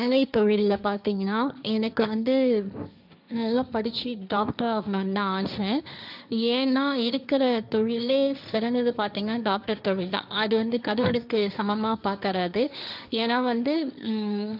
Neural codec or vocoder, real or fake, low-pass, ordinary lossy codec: codec, 16 kHz, 1.1 kbps, Voila-Tokenizer; fake; 5.4 kHz; none